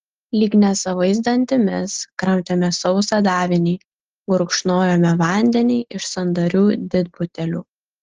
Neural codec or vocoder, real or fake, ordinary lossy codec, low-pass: none; real; Opus, 16 kbps; 7.2 kHz